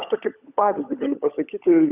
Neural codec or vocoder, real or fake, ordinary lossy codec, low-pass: codec, 16 kHz, 16 kbps, FunCodec, trained on LibriTTS, 50 frames a second; fake; Opus, 64 kbps; 3.6 kHz